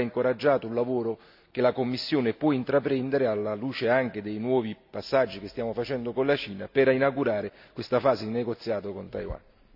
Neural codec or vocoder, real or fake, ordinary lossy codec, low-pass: none; real; none; 5.4 kHz